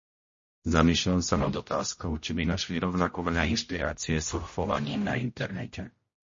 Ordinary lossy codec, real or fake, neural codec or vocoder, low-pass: MP3, 32 kbps; fake; codec, 16 kHz, 0.5 kbps, X-Codec, HuBERT features, trained on general audio; 7.2 kHz